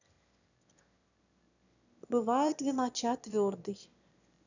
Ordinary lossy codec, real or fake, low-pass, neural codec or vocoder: none; fake; 7.2 kHz; autoencoder, 22.05 kHz, a latent of 192 numbers a frame, VITS, trained on one speaker